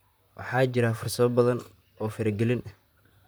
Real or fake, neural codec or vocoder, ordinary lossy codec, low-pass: fake; vocoder, 44.1 kHz, 128 mel bands, Pupu-Vocoder; none; none